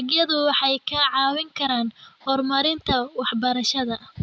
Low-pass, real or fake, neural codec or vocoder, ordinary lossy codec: none; real; none; none